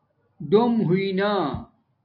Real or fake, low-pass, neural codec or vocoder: real; 5.4 kHz; none